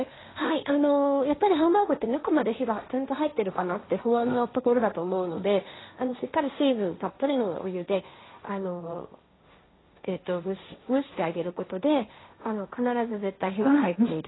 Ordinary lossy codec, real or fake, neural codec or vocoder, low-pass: AAC, 16 kbps; fake; codec, 16 kHz, 1.1 kbps, Voila-Tokenizer; 7.2 kHz